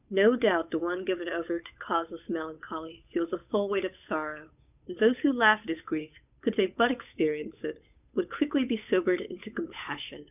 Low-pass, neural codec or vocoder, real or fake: 3.6 kHz; codec, 16 kHz, 8 kbps, FunCodec, trained on Chinese and English, 25 frames a second; fake